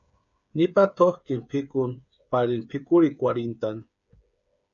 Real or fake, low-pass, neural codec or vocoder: fake; 7.2 kHz; codec, 16 kHz, 8 kbps, FreqCodec, smaller model